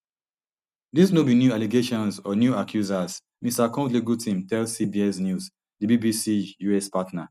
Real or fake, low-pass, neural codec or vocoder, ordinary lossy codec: real; 14.4 kHz; none; none